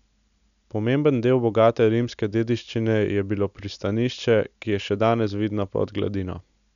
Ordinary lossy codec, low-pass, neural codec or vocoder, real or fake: none; 7.2 kHz; none; real